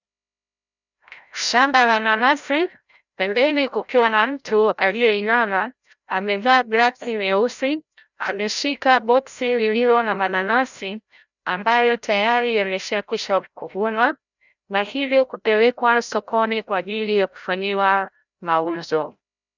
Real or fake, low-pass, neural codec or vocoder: fake; 7.2 kHz; codec, 16 kHz, 0.5 kbps, FreqCodec, larger model